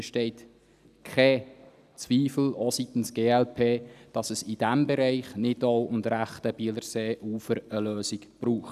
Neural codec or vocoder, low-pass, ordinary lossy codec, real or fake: none; 14.4 kHz; none; real